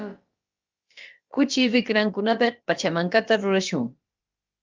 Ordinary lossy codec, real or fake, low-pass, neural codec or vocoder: Opus, 32 kbps; fake; 7.2 kHz; codec, 16 kHz, about 1 kbps, DyCAST, with the encoder's durations